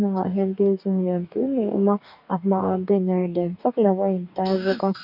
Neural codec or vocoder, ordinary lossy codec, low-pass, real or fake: codec, 44.1 kHz, 2.6 kbps, DAC; none; 5.4 kHz; fake